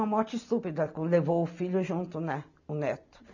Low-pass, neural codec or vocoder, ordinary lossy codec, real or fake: 7.2 kHz; none; none; real